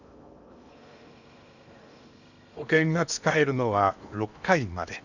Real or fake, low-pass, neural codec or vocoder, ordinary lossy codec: fake; 7.2 kHz; codec, 16 kHz in and 24 kHz out, 0.8 kbps, FocalCodec, streaming, 65536 codes; none